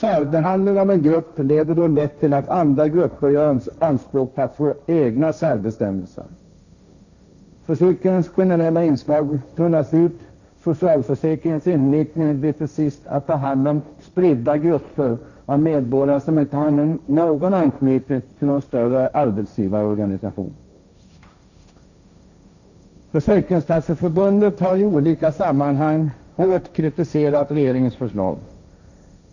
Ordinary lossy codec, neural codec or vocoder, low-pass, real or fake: none; codec, 16 kHz, 1.1 kbps, Voila-Tokenizer; 7.2 kHz; fake